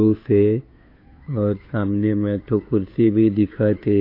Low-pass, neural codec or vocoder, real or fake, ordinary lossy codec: 5.4 kHz; codec, 16 kHz, 2 kbps, FunCodec, trained on Chinese and English, 25 frames a second; fake; AAC, 32 kbps